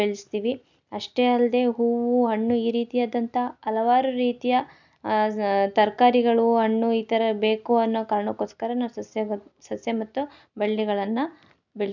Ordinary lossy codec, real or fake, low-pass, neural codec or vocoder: none; real; 7.2 kHz; none